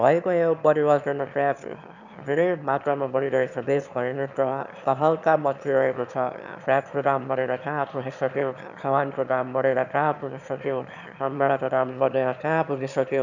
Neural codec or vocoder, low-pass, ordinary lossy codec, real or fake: autoencoder, 22.05 kHz, a latent of 192 numbers a frame, VITS, trained on one speaker; 7.2 kHz; none; fake